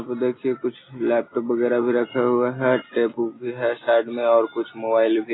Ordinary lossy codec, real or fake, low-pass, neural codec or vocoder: AAC, 16 kbps; real; 7.2 kHz; none